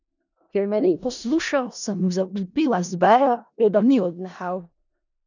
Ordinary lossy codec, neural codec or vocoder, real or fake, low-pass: none; codec, 16 kHz in and 24 kHz out, 0.4 kbps, LongCat-Audio-Codec, four codebook decoder; fake; 7.2 kHz